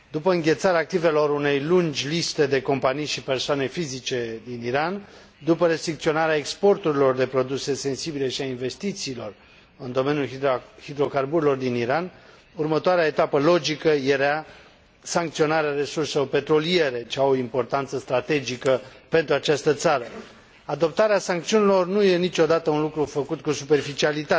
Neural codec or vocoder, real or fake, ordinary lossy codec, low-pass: none; real; none; none